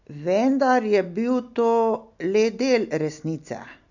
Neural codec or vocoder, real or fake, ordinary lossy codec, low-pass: none; real; none; 7.2 kHz